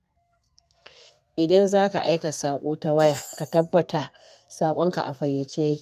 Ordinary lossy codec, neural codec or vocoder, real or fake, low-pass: none; codec, 32 kHz, 1.9 kbps, SNAC; fake; 14.4 kHz